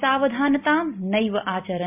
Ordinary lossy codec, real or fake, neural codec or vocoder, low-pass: MP3, 32 kbps; real; none; 3.6 kHz